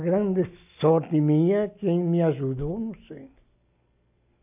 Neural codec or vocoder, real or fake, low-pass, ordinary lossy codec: none; real; 3.6 kHz; AAC, 32 kbps